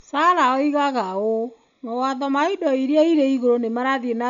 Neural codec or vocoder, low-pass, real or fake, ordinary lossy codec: none; 7.2 kHz; real; none